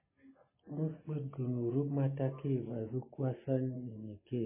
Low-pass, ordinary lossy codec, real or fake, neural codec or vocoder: 3.6 kHz; MP3, 16 kbps; real; none